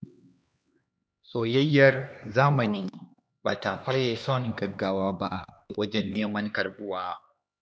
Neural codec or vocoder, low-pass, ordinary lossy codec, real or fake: codec, 16 kHz, 2 kbps, X-Codec, HuBERT features, trained on LibriSpeech; none; none; fake